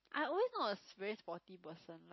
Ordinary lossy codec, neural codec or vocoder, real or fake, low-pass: MP3, 24 kbps; none; real; 7.2 kHz